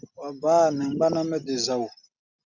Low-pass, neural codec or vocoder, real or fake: 7.2 kHz; none; real